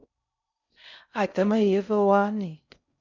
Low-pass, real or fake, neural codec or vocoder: 7.2 kHz; fake; codec, 16 kHz in and 24 kHz out, 0.6 kbps, FocalCodec, streaming, 4096 codes